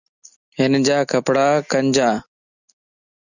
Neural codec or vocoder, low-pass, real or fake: none; 7.2 kHz; real